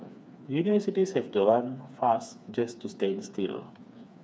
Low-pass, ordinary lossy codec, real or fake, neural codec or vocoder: none; none; fake; codec, 16 kHz, 4 kbps, FreqCodec, smaller model